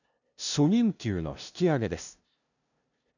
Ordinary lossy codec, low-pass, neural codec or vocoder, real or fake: none; 7.2 kHz; codec, 16 kHz, 0.5 kbps, FunCodec, trained on LibriTTS, 25 frames a second; fake